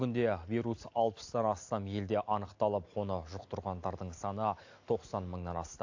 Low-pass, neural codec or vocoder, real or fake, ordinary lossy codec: 7.2 kHz; none; real; AAC, 48 kbps